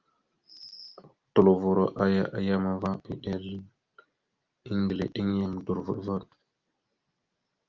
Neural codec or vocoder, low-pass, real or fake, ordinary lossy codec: none; 7.2 kHz; real; Opus, 32 kbps